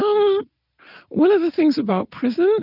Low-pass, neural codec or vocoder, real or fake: 5.4 kHz; none; real